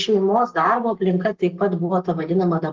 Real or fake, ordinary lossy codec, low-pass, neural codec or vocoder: fake; Opus, 16 kbps; 7.2 kHz; codec, 44.1 kHz, 7.8 kbps, Pupu-Codec